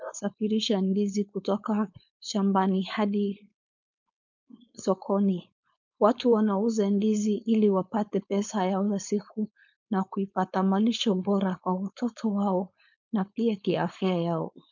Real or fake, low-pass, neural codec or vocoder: fake; 7.2 kHz; codec, 16 kHz, 4.8 kbps, FACodec